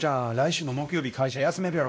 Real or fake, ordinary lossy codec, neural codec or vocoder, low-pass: fake; none; codec, 16 kHz, 1 kbps, X-Codec, WavLM features, trained on Multilingual LibriSpeech; none